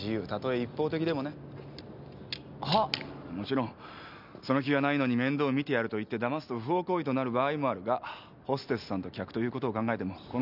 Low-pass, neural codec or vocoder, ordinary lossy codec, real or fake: 5.4 kHz; none; none; real